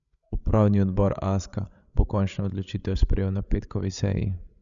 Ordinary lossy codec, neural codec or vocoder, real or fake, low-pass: none; codec, 16 kHz, 16 kbps, FreqCodec, larger model; fake; 7.2 kHz